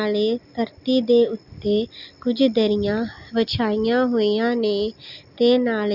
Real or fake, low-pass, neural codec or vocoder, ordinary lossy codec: real; 5.4 kHz; none; Opus, 64 kbps